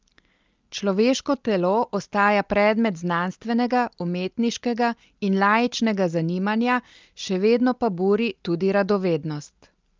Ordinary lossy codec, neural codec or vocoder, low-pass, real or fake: Opus, 24 kbps; none; 7.2 kHz; real